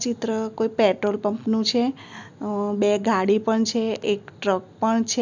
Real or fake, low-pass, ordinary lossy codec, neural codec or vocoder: real; 7.2 kHz; none; none